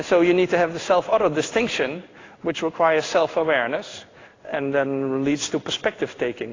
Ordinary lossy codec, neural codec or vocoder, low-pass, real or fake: AAC, 32 kbps; codec, 16 kHz in and 24 kHz out, 1 kbps, XY-Tokenizer; 7.2 kHz; fake